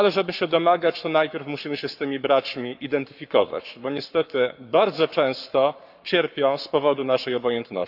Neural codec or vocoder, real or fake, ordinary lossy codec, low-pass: codec, 44.1 kHz, 7.8 kbps, Pupu-Codec; fake; none; 5.4 kHz